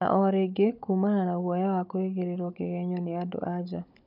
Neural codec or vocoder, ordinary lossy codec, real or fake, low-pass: codec, 16 kHz, 16 kbps, FunCodec, trained on Chinese and English, 50 frames a second; none; fake; 5.4 kHz